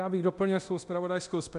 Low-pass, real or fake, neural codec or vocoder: 10.8 kHz; fake; codec, 24 kHz, 0.9 kbps, DualCodec